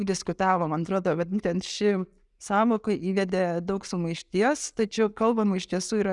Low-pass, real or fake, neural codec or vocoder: 10.8 kHz; real; none